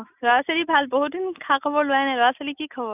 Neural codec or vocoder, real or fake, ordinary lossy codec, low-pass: none; real; none; 3.6 kHz